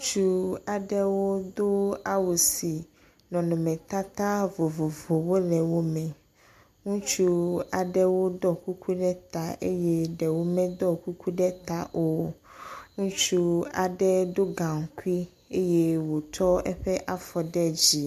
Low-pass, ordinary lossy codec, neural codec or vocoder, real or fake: 14.4 kHz; MP3, 96 kbps; none; real